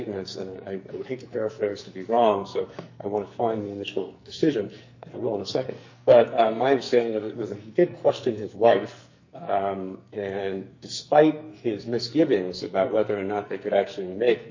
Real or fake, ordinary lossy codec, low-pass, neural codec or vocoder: fake; MP3, 48 kbps; 7.2 kHz; codec, 44.1 kHz, 2.6 kbps, SNAC